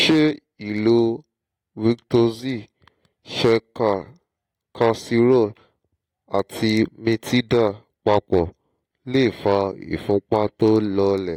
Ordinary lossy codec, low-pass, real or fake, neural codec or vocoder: AAC, 48 kbps; 19.8 kHz; real; none